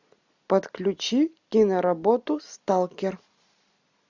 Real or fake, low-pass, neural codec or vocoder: real; 7.2 kHz; none